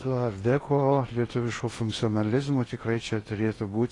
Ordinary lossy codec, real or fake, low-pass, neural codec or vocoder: AAC, 32 kbps; fake; 10.8 kHz; codec, 16 kHz in and 24 kHz out, 0.8 kbps, FocalCodec, streaming, 65536 codes